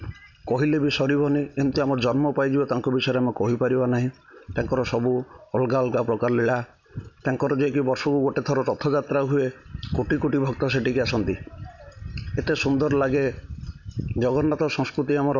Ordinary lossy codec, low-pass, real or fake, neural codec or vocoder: none; 7.2 kHz; real; none